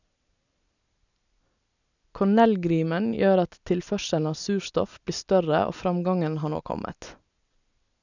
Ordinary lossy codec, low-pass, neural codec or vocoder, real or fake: none; 7.2 kHz; none; real